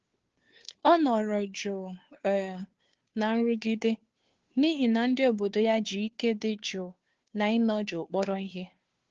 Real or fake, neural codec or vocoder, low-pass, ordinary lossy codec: fake; codec, 16 kHz, 2 kbps, FunCodec, trained on Chinese and English, 25 frames a second; 7.2 kHz; Opus, 16 kbps